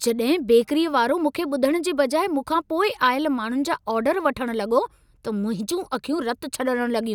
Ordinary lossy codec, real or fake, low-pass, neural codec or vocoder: none; real; 19.8 kHz; none